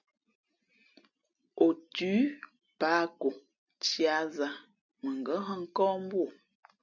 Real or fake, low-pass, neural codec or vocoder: real; 7.2 kHz; none